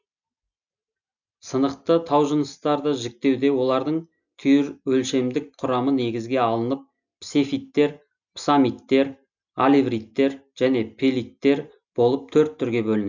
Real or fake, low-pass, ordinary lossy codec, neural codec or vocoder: real; 7.2 kHz; none; none